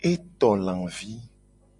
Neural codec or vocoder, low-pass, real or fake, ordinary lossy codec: none; 10.8 kHz; real; MP3, 64 kbps